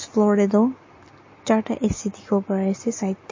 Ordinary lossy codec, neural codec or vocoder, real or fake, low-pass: MP3, 32 kbps; none; real; 7.2 kHz